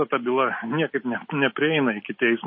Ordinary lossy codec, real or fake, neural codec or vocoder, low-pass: MP3, 24 kbps; real; none; 7.2 kHz